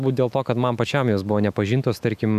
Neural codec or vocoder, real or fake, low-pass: autoencoder, 48 kHz, 128 numbers a frame, DAC-VAE, trained on Japanese speech; fake; 14.4 kHz